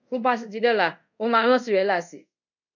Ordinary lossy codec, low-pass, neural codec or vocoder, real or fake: none; 7.2 kHz; codec, 24 kHz, 0.5 kbps, DualCodec; fake